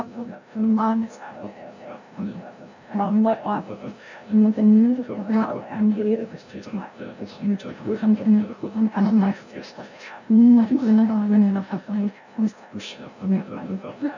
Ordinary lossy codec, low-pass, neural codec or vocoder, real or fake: none; 7.2 kHz; codec, 16 kHz, 0.5 kbps, FreqCodec, larger model; fake